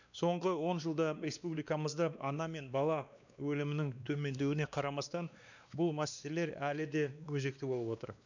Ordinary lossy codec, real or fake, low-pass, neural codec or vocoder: none; fake; 7.2 kHz; codec, 16 kHz, 2 kbps, X-Codec, WavLM features, trained on Multilingual LibriSpeech